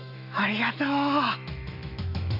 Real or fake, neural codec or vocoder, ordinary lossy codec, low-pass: fake; autoencoder, 48 kHz, 128 numbers a frame, DAC-VAE, trained on Japanese speech; none; 5.4 kHz